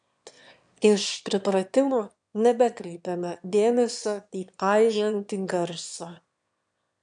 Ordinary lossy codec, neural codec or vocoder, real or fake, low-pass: MP3, 96 kbps; autoencoder, 22.05 kHz, a latent of 192 numbers a frame, VITS, trained on one speaker; fake; 9.9 kHz